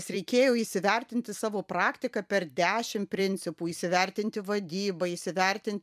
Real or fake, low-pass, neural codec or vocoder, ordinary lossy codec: fake; 14.4 kHz; vocoder, 44.1 kHz, 128 mel bands every 256 samples, BigVGAN v2; MP3, 96 kbps